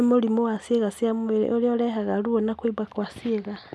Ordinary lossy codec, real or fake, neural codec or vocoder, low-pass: none; real; none; none